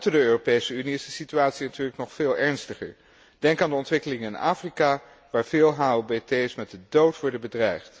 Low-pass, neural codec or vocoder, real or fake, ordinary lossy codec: none; none; real; none